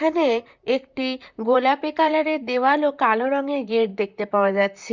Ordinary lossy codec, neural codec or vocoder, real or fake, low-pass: Opus, 64 kbps; vocoder, 44.1 kHz, 128 mel bands, Pupu-Vocoder; fake; 7.2 kHz